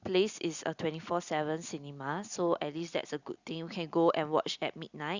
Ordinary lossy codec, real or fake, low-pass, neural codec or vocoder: Opus, 64 kbps; real; 7.2 kHz; none